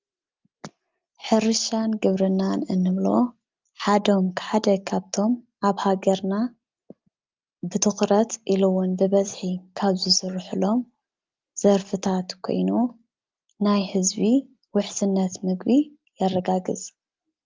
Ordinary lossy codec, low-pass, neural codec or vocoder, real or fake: Opus, 24 kbps; 7.2 kHz; none; real